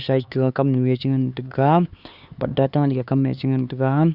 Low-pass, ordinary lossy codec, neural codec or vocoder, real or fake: 5.4 kHz; Opus, 64 kbps; codec, 16 kHz, 4 kbps, X-Codec, HuBERT features, trained on LibriSpeech; fake